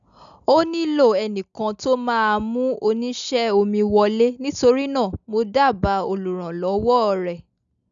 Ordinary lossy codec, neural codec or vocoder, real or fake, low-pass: none; none; real; 7.2 kHz